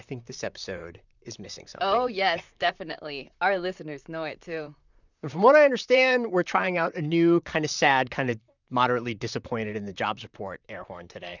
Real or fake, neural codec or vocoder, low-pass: fake; vocoder, 44.1 kHz, 128 mel bands, Pupu-Vocoder; 7.2 kHz